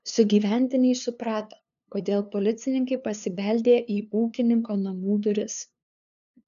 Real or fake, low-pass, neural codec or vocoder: fake; 7.2 kHz; codec, 16 kHz, 2 kbps, FunCodec, trained on LibriTTS, 25 frames a second